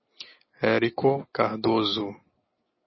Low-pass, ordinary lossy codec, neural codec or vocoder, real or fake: 7.2 kHz; MP3, 24 kbps; none; real